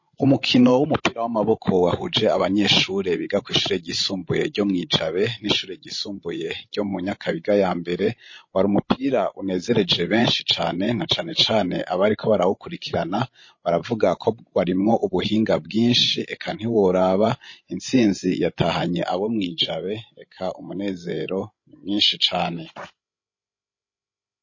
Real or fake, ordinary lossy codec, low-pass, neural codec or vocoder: fake; MP3, 32 kbps; 7.2 kHz; codec, 16 kHz, 16 kbps, FreqCodec, larger model